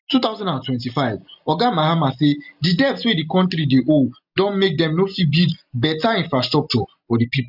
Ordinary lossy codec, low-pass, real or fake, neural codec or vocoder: none; 5.4 kHz; real; none